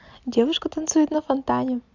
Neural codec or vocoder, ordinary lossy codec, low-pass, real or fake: none; Opus, 64 kbps; 7.2 kHz; real